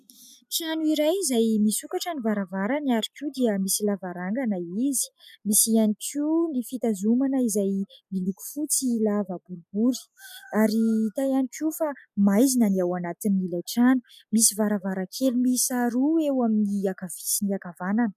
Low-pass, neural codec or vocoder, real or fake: 14.4 kHz; none; real